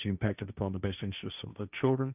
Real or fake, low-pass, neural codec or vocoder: fake; 3.6 kHz; codec, 16 kHz, 1.1 kbps, Voila-Tokenizer